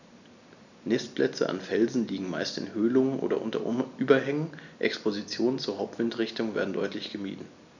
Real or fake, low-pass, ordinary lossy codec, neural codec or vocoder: real; 7.2 kHz; none; none